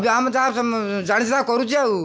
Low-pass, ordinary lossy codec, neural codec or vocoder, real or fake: none; none; none; real